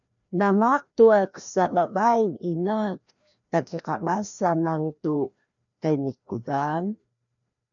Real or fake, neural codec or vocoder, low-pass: fake; codec, 16 kHz, 1 kbps, FreqCodec, larger model; 7.2 kHz